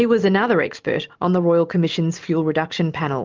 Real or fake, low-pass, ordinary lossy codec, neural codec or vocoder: real; 7.2 kHz; Opus, 32 kbps; none